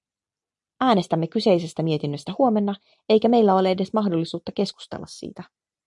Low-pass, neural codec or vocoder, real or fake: 10.8 kHz; none; real